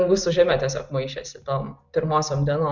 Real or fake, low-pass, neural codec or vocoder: real; 7.2 kHz; none